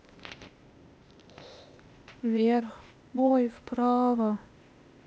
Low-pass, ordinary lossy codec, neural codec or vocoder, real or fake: none; none; codec, 16 kHz, 0.8 kbps, ZipCodec; fake